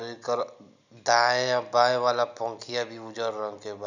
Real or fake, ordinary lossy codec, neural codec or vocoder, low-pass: real; none; none; 7.2 kHz